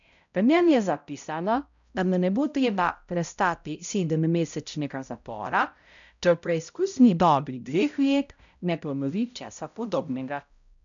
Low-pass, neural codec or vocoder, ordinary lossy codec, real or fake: 7.2 kHz; codec, 16 kHz, 0.5 kbps, X-Codec, HuBERT features, trained on balanced general audio; none; fake